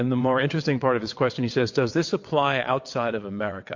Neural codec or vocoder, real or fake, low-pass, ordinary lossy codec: vocoder, 22.05 kHz, 80 mel bands, WaveNeXt; fake; 7.2 kHz; MP3, 48 kbps